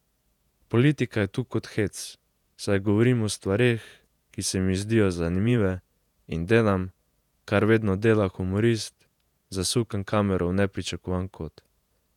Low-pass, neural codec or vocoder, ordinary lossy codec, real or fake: 19.8 kHz; vocoder, 48 kHz, 128 mel bands, Vocos; none; fake